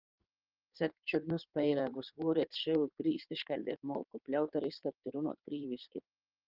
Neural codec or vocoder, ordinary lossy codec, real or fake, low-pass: codec, 16 kHz in and 24 kHz out, 2.2 kbps, FireRedTTS-2 codec; Opus, 32 kbps; fake; 5.4 kHz